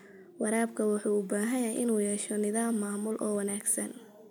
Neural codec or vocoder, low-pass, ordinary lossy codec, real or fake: none; none; none; real